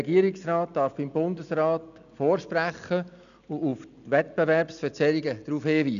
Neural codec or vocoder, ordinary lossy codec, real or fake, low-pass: none; none; real; 7.2 kHz